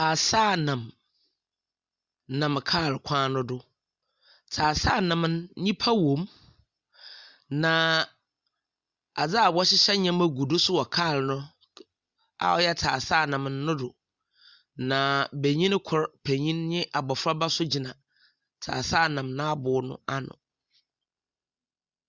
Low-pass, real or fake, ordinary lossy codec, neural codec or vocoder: 7.2 kHz; real; Opus, 64 kbps; none